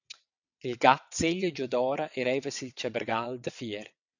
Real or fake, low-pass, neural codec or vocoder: fake; 7.2 kHz; vocoder, 22.05 kHz, 80 mel bands, WaveNeXt